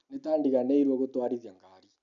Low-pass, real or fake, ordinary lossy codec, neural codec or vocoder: 7.2 kHz; real; none; none